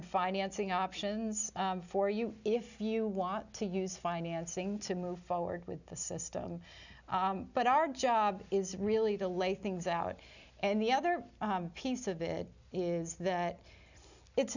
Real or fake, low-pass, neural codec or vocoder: real; 7.2 kHz; none